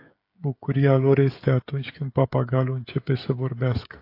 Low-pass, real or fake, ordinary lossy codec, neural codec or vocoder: 5.4 kHz; fake; AAC, 32 kbps; codec, 16 kHz, 16 kbps, FreqCodec, smaller model